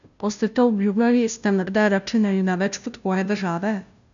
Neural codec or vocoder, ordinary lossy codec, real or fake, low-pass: codec, 16 kHz, 0.5 kbps, FunCodec, trained on Chinese and English, 25 frames a second; none; fake; 7.2 kHz